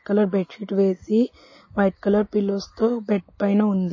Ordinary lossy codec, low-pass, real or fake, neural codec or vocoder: MP3, 32 kbps; 7.2 kHz; real; none